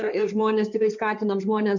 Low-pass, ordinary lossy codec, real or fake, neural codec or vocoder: 7.2 kHz; MP3, 64 kbps; fake; codec, 16 kHz in and 24 kHz out, 2.2 kbps, FireRedTTS-2 codec